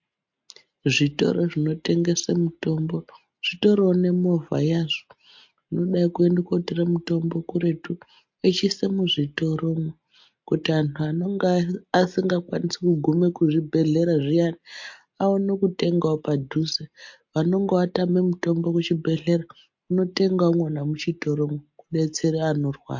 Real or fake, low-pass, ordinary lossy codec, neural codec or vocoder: real; 7.2 kHz; MP3, 48 kbps; none